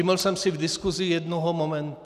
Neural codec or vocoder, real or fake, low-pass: none; real; 14.4 kHz